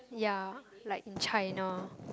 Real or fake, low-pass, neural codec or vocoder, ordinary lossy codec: real; none; none; none